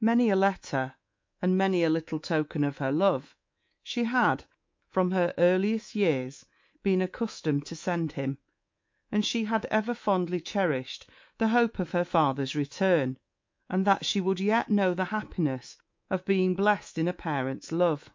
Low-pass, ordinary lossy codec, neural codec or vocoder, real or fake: 7.2 kHz; MP3, 48 kbps; codec, 24 kHz, 3.1 kbps, DualCodec; fake